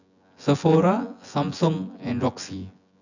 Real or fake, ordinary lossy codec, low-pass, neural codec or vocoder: fake; none; 7.2 kHz; vocoder, 24 kHz, 100 mel bands, Vocos